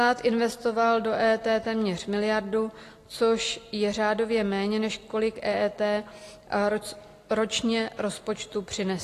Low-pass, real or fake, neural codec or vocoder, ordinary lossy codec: 14.4 kHz; real; none; AAC, 48 kbps